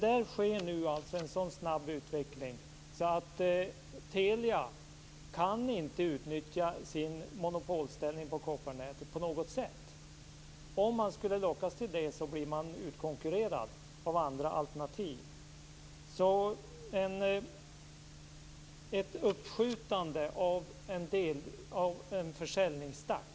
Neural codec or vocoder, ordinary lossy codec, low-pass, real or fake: none; none; none; real